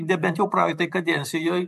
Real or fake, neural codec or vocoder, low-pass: fake; vocoder, 44.1 kHz, 128 mel bands every 512 samples, BigVGAN v2; 14.4 kHz